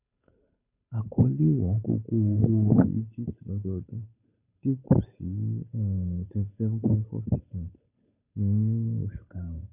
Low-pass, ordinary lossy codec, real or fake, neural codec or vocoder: 3.6 kHz; none; fake; codec, 16 kHz, 8 kbps, FunCodec, trained on Chinese and English, 25 frames a second